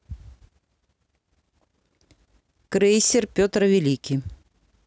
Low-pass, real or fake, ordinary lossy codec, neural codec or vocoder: none; real; none; none